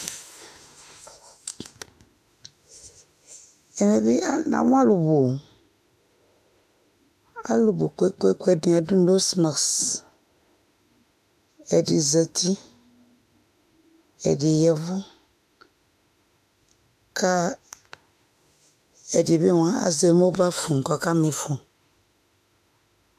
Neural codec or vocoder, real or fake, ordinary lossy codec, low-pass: autoencoder, 48 kHz, 32 numbers a frame, DAC-VAE, trained on Japanese speech; fake; AAC, 96 kbps; 14.4 kHz